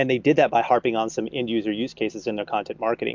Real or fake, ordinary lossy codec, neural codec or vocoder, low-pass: real; MP3, 64 kbps; none; 7.2 kHz